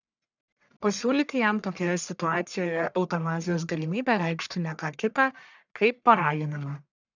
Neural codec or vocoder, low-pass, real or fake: codec, 44.1 kHz, 1.7 kbps, Pupu-Codec; 7.2 kHz; fake